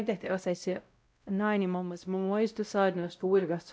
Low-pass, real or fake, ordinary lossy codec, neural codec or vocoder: none; fake; none; codec, 16 kHz, 0.5 kbps, X-Codec, WavLM features, trained on Multilingual LibriSpeech